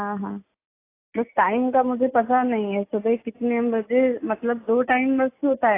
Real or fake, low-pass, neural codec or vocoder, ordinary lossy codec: real; 3.6 kHz; none; AAC, 24 kbps